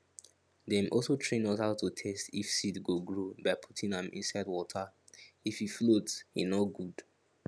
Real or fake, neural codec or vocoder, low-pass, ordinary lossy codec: real; none; none; none